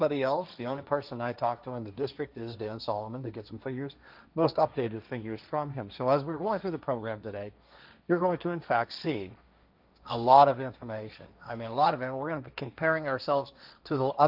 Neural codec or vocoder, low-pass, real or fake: codec, 16 kHz, 1.1 kbps, Voila-Tokenizer; 5.4 kHz; fake